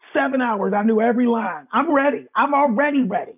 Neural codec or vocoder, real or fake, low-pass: none; real; 3.6 kHz